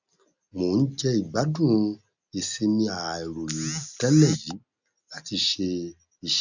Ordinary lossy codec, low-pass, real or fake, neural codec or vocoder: none; 7.2 kHz; real; none